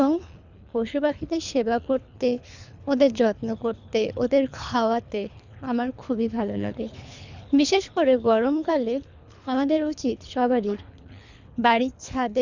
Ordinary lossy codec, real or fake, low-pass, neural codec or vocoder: none; fake; 7.2 kHz; codec, 24 kHz, 3 kbps, HILCodec